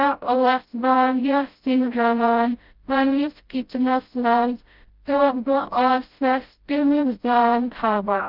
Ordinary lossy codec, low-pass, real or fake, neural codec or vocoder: Opus, 32 kbps; 5.4 kHz; fake; codec, 16 kHz, 0.5 kbps, FreqCodec, smaller model